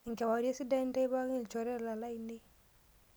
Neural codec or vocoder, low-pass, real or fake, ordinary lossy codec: none; none; real; none